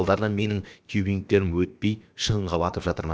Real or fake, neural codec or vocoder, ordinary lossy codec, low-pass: fake; codec, 16 kHz, about 1 kbps, DyCAST, with the encoder's durations; none; none